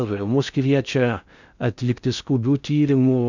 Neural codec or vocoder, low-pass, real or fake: codec, 16 kHz in and 24 kHz out, 0.6 kbps, FocalCodec, streaming, 4096 codes; 7.2 kHz; fake